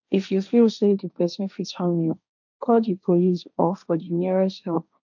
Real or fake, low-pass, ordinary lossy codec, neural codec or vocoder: fake; 7.2 kHz; none; codec, 16 kHz, 1.1 kbps, Voila-Tokenizer